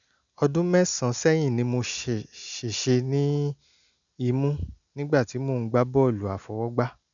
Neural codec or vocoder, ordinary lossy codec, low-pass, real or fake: none; none; 7.2 kHz; real